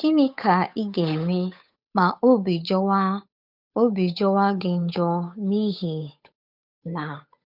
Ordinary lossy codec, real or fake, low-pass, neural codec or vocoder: none; fake; 5.4 kHz; codec, 16 kHz, 8 kbps, FunCodec, trained on Chinese and English, 25 frames a second